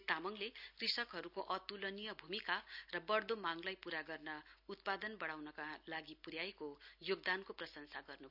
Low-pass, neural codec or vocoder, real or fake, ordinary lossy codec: 5.4 kHz; none; real; none